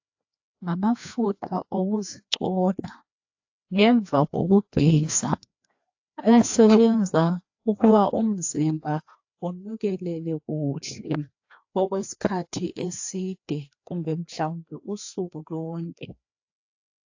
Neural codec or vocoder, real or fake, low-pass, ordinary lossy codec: codec, 16 kHz, 2 kbps, FreqCodec, larger model; fake; 7.2 kHz; AAC, 48 kbps